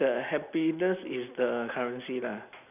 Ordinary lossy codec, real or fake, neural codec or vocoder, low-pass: none; real; none; 3.6 kHz